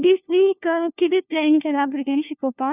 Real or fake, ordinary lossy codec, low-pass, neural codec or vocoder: fake; none; 3.6 kHz; codec, 16 kHz, 1 kbps, FunCodec, trained on LibriTTS, 50 frames a second